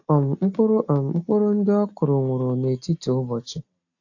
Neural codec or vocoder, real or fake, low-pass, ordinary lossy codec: none; real; 7.2 kHz; AAC, 48 kbps